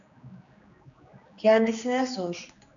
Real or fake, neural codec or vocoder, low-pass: fake; codec, 16 kHz, 2 kbps, X-Codec, HuBERT features, trained on general audio; 7.2 kHz